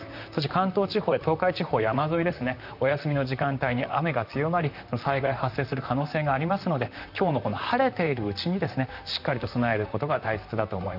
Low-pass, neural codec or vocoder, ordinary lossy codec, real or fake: 5.4 kHz; vocoder, 44.1 kHz, 128 mel bands, Pupu-Vocoder; none; fake